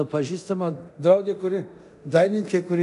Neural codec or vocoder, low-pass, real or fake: codec, 24 kHz, 0.9 kbps, DualCodec; 10.8 kHz; fake